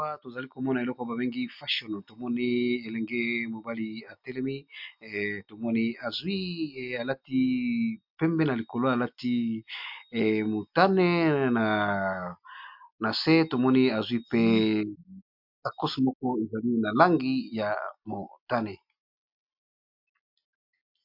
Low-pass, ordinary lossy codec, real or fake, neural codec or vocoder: 5.4 kHz; MP3, 48 kbps; real; none